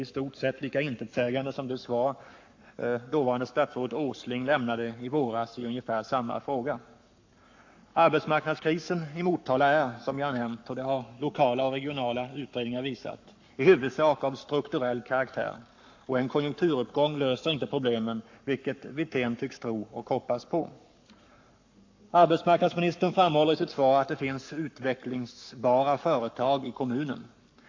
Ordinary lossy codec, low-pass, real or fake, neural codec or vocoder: AAC, 48 kbps; 7.2 kHz; fake; codec, 44.1 kHz, 7.8 kbps, Pupu-Codec